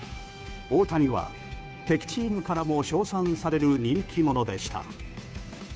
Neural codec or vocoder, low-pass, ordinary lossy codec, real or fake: codec, 16 kHz, 2 kbps, FunCodec, trained on Chinese and English, 25 frames a second; none; none; fake